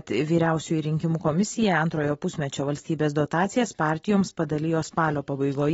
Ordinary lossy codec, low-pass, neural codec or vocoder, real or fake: AAC, 24 kbps; 19.8 kHz; none; real